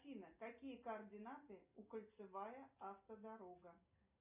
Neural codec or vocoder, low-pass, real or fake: none; 3.6 kHz; real